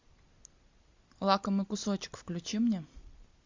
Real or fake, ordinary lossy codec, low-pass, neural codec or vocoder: real; MP3, 64 kbps; 7.2 kHz; none